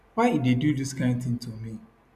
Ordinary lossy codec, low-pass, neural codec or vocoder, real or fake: none; 14.4 kHz; none; real